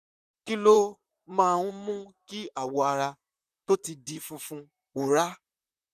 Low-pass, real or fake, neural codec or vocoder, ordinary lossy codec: 14.4 kHz; fake; vocoder, 44.1 kHz, 128 mel bands, Pupu-Vocoder; none